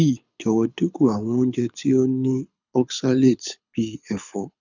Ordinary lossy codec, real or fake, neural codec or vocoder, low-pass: none; fake; codec, 24 kHz, 6 kbps, HILCodec; 7.2 kHz